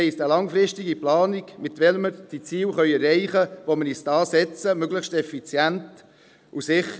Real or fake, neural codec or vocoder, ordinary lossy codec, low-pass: real; none; none; none